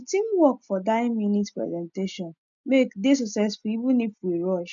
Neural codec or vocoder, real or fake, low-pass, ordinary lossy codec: none; real; 7.2 kHz; none